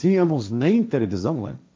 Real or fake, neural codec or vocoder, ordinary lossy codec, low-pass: fake; codec, 16 kHz, 1.1 kbps, Voila-Tokenizer; none; none